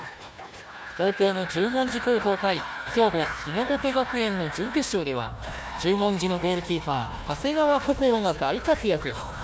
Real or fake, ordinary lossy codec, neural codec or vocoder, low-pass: fake; none; codec, 16 kHz, 1 kbps, FunCodec, trained on Chinese and English, 50 frames a second; none